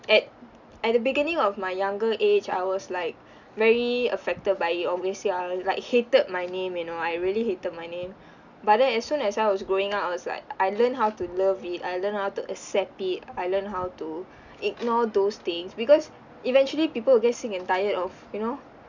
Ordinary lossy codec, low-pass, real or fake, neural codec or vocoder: none; 7.2 kHz; real; none